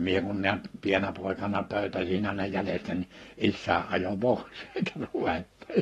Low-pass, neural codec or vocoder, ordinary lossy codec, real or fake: 19.8 kHz; codec, 44.1 kHz, 7.8 kbps, Pupu-Codec; AAC, 32 kbps; fake